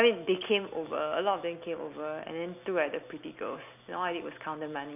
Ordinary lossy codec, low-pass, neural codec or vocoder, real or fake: none; 3.6 kHz; none; real